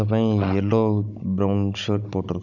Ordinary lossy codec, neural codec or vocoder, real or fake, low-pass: none; codec, 16 kHz, 16 kbps, FunCodec, trained on Chinese and English, 50 frames a second; fake; 7.2 kHz